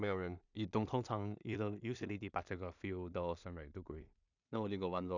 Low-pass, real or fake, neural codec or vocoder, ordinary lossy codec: 7.2 kHz; fake; codec, 16 kHz in and 24 kHz out, 0.4 kbps, LongCat-Audio-Codec, two codebook decoder; none